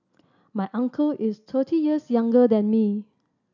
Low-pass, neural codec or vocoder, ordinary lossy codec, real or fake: 7.2 kHz; none; none; real